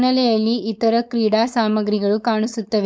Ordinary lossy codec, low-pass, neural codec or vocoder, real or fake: none; none; codec, 16 kHz, 4.8 kbps, FACodec; fake